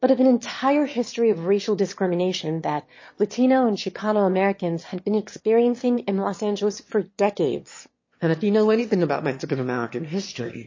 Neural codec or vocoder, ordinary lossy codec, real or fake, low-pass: autoencoder, 22.05 kHz, a latent of 192 numbers a frame, VITS, trained on one speaker; MP3, 32 kbps; fake; 7.2 kHz